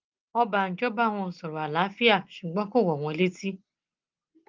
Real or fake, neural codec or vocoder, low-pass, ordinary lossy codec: real; none; 7.2 kHz; Opus, 24 kbps